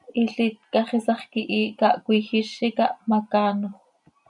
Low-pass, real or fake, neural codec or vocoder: 10.8 kHz; real; none